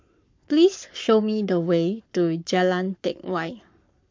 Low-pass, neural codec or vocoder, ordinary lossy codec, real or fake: 7.2 kHz; codec, 44.1 kHz, 7.8 kbps, Pupu-Codec; MP3, 48 kbps; fake